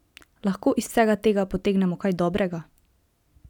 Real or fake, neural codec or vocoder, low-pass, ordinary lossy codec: real; none; 19.8 kHz; none